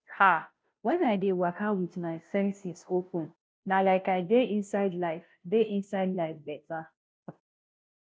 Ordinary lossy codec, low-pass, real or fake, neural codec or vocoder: none; none; fake; codec, 16 kHz, 0.5 kbps, FunCodec, trained on Chinese and English, 25 frames a second